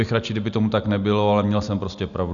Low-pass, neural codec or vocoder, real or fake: 7.2 kHz; none; real